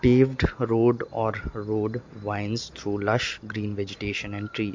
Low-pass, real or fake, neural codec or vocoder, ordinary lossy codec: 7.2 kHz; real; none; MP3, 48 kbps